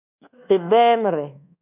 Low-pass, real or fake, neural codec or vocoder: 3.6 kHz; fake; codec, 24 kHz, 1.2 kbps, DualCodec